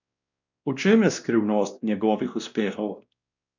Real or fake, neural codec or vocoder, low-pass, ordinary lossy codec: fake; codec, 16 kHz, 1 kbps, X-Codec, WavLM features, trained on Multilingual LibriSpeech; 7.2 kHz; none